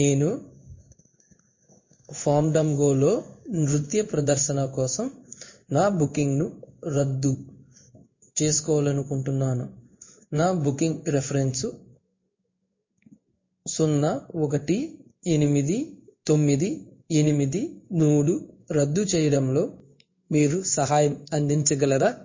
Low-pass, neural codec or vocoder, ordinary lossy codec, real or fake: 7.2 kHz; codec, 16 kHz in and 24 kHz out, 1 kbps, XY-Tokenizer; MP3, 32 kbps; fake